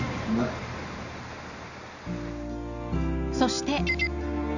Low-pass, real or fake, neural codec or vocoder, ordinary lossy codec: 7.2 kHz; real; none; none